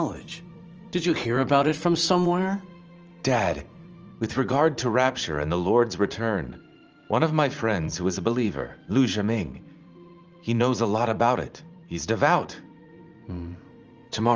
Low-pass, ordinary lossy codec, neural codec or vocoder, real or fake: 7.2 kHz; Opus, 24 kbps; vocoder, 44.1 kHz, 80 mel bands, Vocos; fake